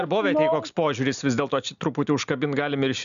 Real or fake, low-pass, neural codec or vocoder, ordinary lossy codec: real; 7.2 kHz; none; MP3, 96 kbps